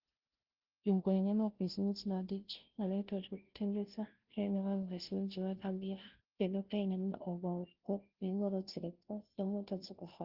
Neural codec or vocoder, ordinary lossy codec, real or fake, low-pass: codec, 16 kHz, 0.5 kbps, FunCodec, trained on Chinese and English, 25 frames a second; Opus, 16 kbps; fake; 5.4 kHz